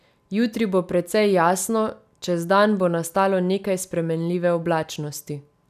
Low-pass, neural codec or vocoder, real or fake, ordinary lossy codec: 14.4 kHz; none; real; none